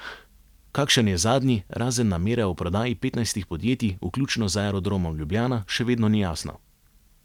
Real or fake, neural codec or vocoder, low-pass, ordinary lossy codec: real; none; 19.8 kHz; none